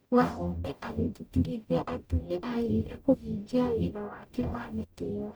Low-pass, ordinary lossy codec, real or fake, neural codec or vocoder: none; none; fake; codec, 44.1 kHz, 0.9 kbps, DAC